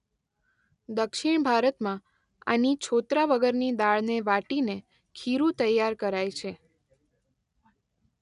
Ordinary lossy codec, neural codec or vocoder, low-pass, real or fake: none; none; 10.8 kHz; real